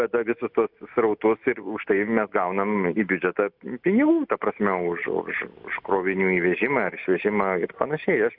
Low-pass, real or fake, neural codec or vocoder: 5.4 kHz; real; none